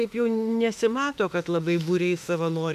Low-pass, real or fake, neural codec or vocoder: 14.4 kHz; fake; autoencoder, 48 kHz, 32 numbers a frame, DAC-VAE, trained on Japanese speech